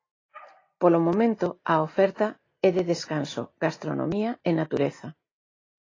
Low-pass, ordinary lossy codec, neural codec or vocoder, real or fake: 7.2 kHz; AAC, 32 kbps; none; real